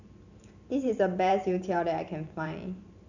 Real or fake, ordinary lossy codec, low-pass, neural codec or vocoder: fake; none; 7.2 kHz; vocoder, 44.1 kHz, 128 mel bands every 512 samples, BigVGAN v2